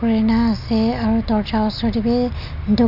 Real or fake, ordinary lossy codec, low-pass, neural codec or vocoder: real; none; 5.4 kHz; none